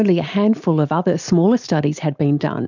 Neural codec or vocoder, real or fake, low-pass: none; real; 7.2 kHz